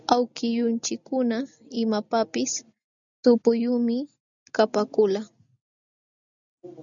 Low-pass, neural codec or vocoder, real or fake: 7.2 kHz; none; real